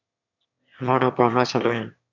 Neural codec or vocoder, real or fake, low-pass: autoencoder, 22.05 kHz, a latent of 192 numbers a frame, VITS, trained on one speaker; fake; 7.2 kHz